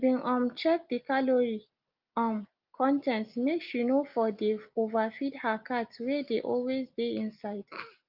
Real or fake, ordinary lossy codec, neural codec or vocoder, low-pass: real; Opus, 24 kbps; none; 5.4 kHz